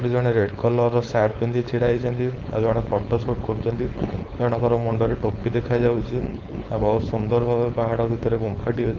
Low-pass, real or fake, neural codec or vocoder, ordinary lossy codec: 7.2 kHz; fake; codec, 16 kHz, 4.8 kbps, FACodec; Opus, 24 kbps